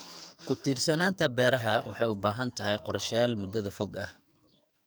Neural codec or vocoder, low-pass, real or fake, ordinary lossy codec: codec, 44.1 kHz, 2.6 kbps, SNAC; none; fake; none